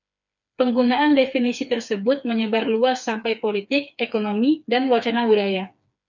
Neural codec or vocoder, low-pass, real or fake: codec, 16 kHz, 4 kbps, FreqCodec, smaller model; 7.2 kHz; fake